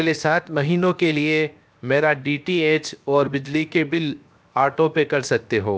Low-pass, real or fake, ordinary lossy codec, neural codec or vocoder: none; fake; none; codec, 16 kHz, 0.7 kbps, FocalCodec